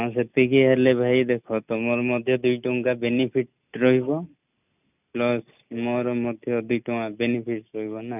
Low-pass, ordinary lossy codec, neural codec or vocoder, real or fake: 3.6 kHz; none; none; real